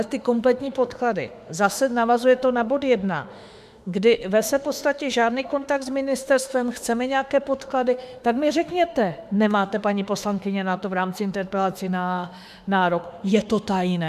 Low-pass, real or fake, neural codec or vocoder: 14.4 kHz; fake; autoencoder, 48 kHz, 32 numbers a frame, DAC-VAE, trained on Japanese speech